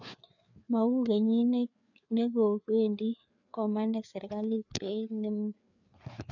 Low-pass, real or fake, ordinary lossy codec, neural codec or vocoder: 7.2 kHz; fake; none; codec, 16 kHz, 4 kbps, FreqCodec, larger model